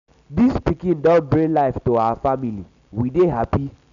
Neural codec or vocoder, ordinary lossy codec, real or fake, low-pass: none; none; real; 7.2 kHz